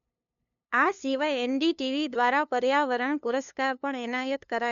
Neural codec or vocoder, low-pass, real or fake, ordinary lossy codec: codec, 16 kHz, 2 kbps, FunCodec, trained on LibriTTS, 25 frames a second; 7.2 kHz; fake; none